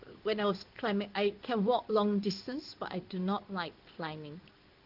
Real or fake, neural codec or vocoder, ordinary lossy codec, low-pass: fake; codec, 16 kHz in and 24 kHz out, 1 kbps, XY-Tokenizer; Opus, 32 kbps; 5.4 kHz